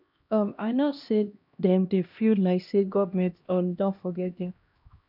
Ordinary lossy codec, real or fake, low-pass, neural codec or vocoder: none; fake; 5.4 kHz; codec, 16 kHz, 1 kbps, X-Codec, HuBERT features, trained on LibriSpeech